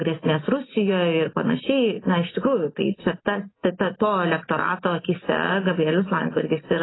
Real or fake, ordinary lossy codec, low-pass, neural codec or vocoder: real; AAC, 16 kbps; 7.2 kHz; none